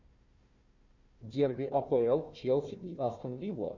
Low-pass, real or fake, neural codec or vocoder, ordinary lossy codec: 7.2 kHz; fake; codec, 16 kHz, 1 kbps, FunCodec, trained on Chinese and English, 50 frames a second; Opus, 64 kbps